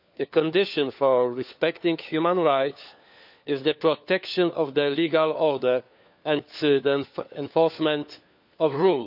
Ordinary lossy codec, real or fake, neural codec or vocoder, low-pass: none; fake; codec, 16 kHz, 4 kbps, FunCodec, trained on LibriTTS, 50 frames a second; 5.4 kHz